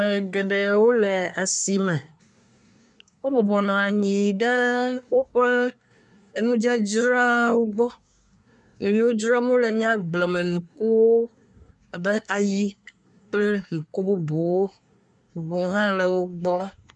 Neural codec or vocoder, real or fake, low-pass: codec, 24 kHz, 1 kbps, SNAC; fake; 10.8 kHz